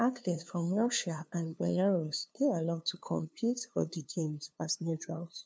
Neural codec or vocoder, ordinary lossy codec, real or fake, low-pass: codec, 16 kHz, 2 kbps, FunCodec, trained on LibriTTS, 25 frames a second; none; fake; none